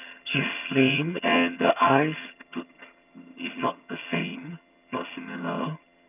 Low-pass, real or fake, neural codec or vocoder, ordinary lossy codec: 3.6 kHz; fake; vocoder, 22.05 kHz, 80 mel bands, HiFi-GAN; none